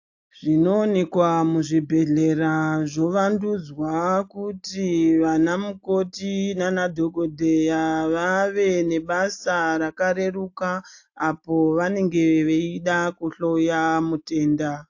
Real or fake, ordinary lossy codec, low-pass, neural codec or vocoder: real; AAC, 48 kbps; 7.2 kHz; none